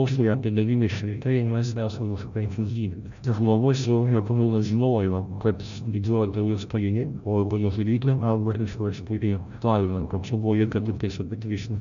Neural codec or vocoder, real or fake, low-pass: codec, 16 kHz, 0.5 kbps, FreqCodec, larger model; fake; 7.2 kHz